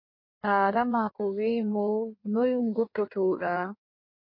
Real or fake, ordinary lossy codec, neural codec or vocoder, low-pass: fake; MP3, 32 kbps; codec, 16 kHz in and 24 kHz out, 1.1 kbps, FireRedTTS-2 codec; 5.4 kHz